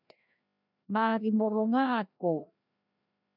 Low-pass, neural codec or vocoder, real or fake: 5.4 kHz; codec, 16 kHz, 1 kbps, FreqCodec, larger model; fake